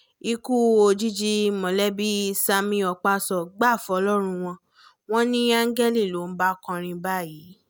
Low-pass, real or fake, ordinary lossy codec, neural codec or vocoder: none; real; none; none